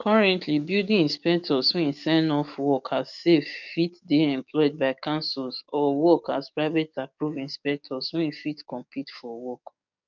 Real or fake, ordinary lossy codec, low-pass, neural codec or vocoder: fake; none; 7.2 kHz; codec, 44.1 kHz, 7.8 kbps, DAC